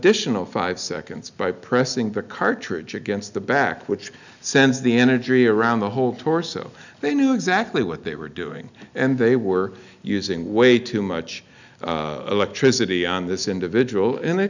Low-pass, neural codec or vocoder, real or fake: 7.2 kHz; none; real